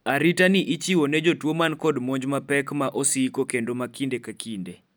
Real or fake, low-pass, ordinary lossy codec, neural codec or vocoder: real; none; none; none